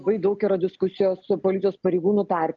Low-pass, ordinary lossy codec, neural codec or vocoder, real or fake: 7.2 kHz; Opus, 32 kbps; none; real